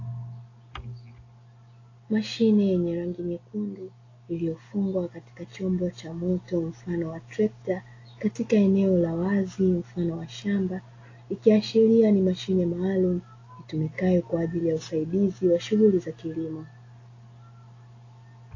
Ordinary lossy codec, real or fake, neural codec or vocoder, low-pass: AAC, 32 kbps; real; none; 7.2 kHz